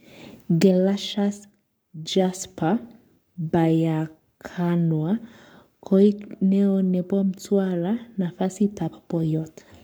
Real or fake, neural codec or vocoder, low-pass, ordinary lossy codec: fake; codec, 44.1 kHz, 7.8 kbps, Pupu-Codec; none; none